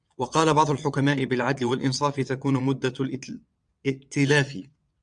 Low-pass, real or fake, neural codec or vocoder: 9.9 kHz; fake; vocoder, 22.05 kHz, 80 mel bands, WaveNeXt